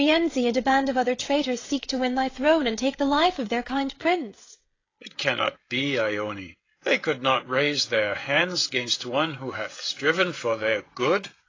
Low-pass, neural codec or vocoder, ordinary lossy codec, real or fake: 7.2 kHz; codec, 16 kHz, 16 kbps, FreqCodec, smaller model; AAC, 32 kbps; fake